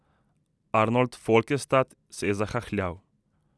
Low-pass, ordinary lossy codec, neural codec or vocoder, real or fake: none; none; none; real